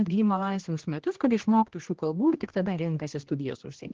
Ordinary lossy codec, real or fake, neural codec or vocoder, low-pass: Opus, 16 kbps; fake; codec, 16 kHz, 1 kbps, X-Codec, HuBERT features, trained on general audio; 7.2 kHz